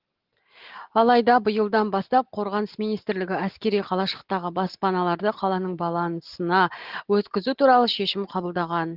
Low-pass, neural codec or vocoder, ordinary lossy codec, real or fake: 5.4 kHz; none; Opus, 16 kbps; real